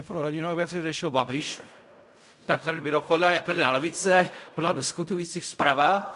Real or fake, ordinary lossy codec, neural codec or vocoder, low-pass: fake; MP3, 96 kbps; codec, 16 kHz in and 24 kHz out, 0.4 kbps, LongCat-Audio-Codec, fine tuned four codebook decoder; 10.8 kHz